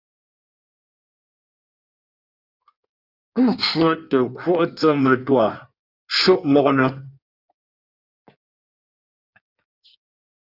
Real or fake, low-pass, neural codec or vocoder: fake; 5.4 kHz; codec, 16 kHz in and 24 kHz out, 1.1 kbps, FireRedTTS-2 codec